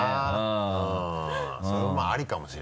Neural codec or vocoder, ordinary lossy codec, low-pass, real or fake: none; none; none; real